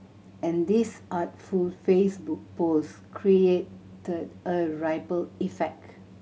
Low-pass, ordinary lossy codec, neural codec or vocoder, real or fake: none; none; none; real